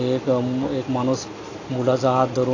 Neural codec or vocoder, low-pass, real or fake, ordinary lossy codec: none; 7.2 kHz; real; AAC, 32 kbps